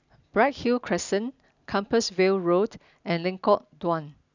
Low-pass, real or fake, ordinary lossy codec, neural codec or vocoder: 7.2 kHz; real; none; none